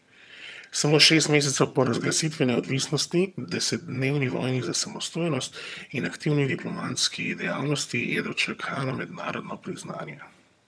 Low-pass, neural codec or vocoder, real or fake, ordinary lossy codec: none; vocoder, 22.05 kHz, 80 mel bands, HiFi-GAN; fake; none